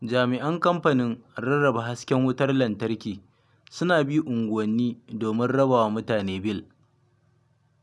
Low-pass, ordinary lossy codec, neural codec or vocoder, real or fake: none; none; none; real